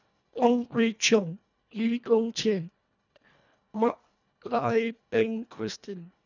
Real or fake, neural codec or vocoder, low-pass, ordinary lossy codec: fake; codec, 24 kHz, 1.5 kbps, HILCodec; 7.2 kHz; none